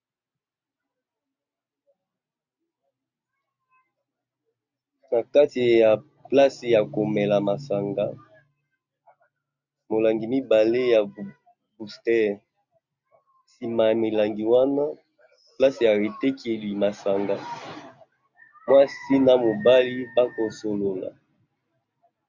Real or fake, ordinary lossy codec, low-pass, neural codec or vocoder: real; MP3, 64 kbps; 7.2 kHz; none